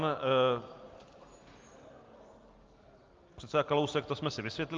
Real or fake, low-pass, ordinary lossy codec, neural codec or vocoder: real; 7.2 kHz; Opus, 32 kbps; none